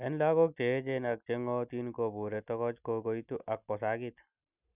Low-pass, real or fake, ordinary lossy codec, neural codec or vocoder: 3.6 kHz; real; none; none